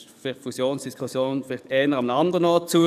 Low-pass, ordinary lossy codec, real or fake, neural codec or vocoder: 14.4 kHz; none; fake; codec, 44.1 kHz, 7.8 kbps, DAC